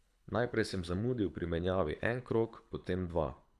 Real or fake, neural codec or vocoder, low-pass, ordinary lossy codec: fake; codec, 24 kHz, 6 kbps, HILCodec; none; none